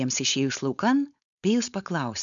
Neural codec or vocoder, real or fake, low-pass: codec, 16 kHz, 4.8 kbps, FACodec; fake; 7.2 kHz